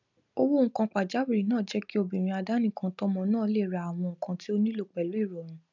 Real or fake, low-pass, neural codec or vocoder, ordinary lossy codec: real; 7.2 kHz; none; none